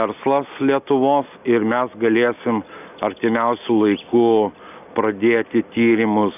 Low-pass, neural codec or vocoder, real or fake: 3.6 kHz; none; real